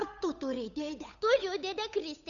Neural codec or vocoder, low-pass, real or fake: codec, 16 kHz, 8 kbps, FunCodec, trained on Chinese and English, 25 frames a second; 7.2 kHz; fake